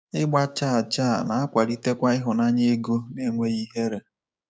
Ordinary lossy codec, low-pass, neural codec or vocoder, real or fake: none; none; codec, 16 kHz, 6 kbps, DAC; fake